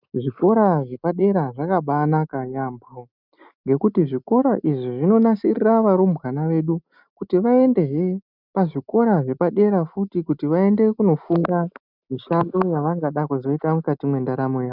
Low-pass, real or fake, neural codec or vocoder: 5.4 kHz; real; none